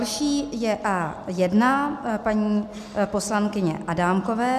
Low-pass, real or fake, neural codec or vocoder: 14.4 kHz; real; none